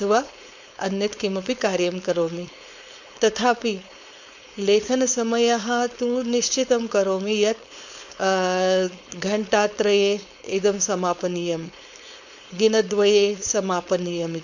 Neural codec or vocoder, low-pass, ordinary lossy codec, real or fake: codec, 16 kHz, 4.8 kbps, FACodec; 7.2 kHz; none; fake